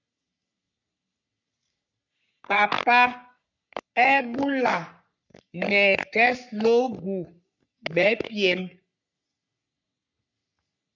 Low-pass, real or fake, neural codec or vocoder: 7.2 kHz; fake; codec, 44.1 kHz, 3.4 kbps, Pupu-Codec